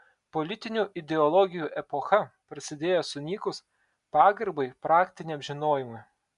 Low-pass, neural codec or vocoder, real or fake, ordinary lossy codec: 10.8 kHz; none; real; MP3, 96 kbps